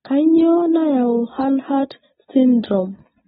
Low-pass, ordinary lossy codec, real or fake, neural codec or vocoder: 19.8 kHz; AAC, 16 kbps; real; none